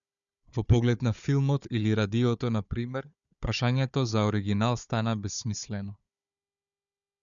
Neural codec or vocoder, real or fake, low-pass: codec, 16 kHz, 4 kbps, FunCodec, trained on Chinese and English, 50 frames a second; fake; 7.2 kHz